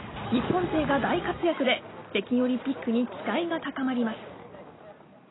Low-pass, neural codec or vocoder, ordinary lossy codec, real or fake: 7.2 kHz; none; AAC, 16 kbps; real